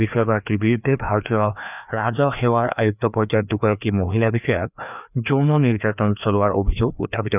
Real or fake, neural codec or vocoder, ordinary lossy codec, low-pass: fake; codec, 16 kHz, 2 kbps, FreqCodec, larger model; none; 3.6 kHz